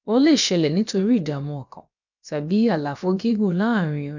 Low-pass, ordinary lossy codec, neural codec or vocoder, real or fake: 7.2 kHz; none; codec, 16 kHz, about 1 kbps, DyCAST, with the encoder's durations; fake